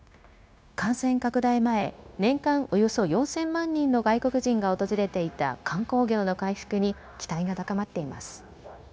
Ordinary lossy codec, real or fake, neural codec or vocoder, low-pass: none; fake; codec, 16 kHz, 0.9 kbps, LongCat-Audio-Codec; none